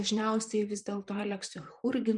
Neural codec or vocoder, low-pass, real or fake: vocoder, 44.1 kHz, 128 mel bands, Pupu-Vocoder; 10.8 kHz; fake